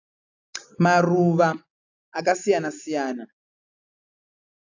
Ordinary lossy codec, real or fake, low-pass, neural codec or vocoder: AAC, 48 kbps; real; 7.2 kHz; none